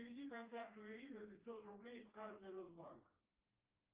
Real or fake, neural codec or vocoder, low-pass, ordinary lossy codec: fake; codec, 16 kHz, 2 kbps, FreqCodec, smaller model; 3.6 kHz; Opus, 32 kbps